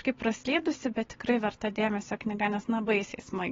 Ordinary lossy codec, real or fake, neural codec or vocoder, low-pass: AAC, 24 kbps; real; none; 7.2 kHz